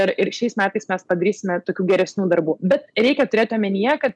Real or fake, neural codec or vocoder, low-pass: real; none; 10.8 kHz